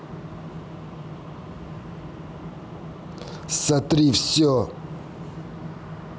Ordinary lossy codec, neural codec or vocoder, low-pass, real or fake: none; none; none; real